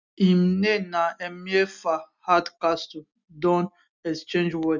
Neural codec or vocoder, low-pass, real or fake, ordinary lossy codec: none; 7.2 kHz; real; none